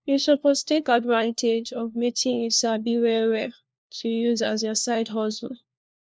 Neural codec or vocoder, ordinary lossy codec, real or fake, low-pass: codec, 16 kHz, 1 kbps, FunCodec, trained on LibriTTS, 50 frames a second; none; fake; none